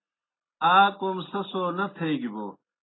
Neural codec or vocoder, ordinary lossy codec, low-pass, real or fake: none; AAC, 16 kbps; 7.2 kHz; real